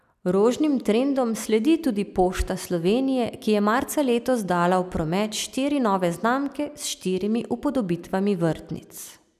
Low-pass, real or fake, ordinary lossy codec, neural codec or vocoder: 14.4 kHz; real; none; none